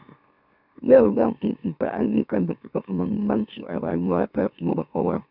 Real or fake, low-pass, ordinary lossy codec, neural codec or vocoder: fake; 5.4 kHz; none; autoencoder, 44.1 kHz, a latent of 192 numbers a frame, MeloTTS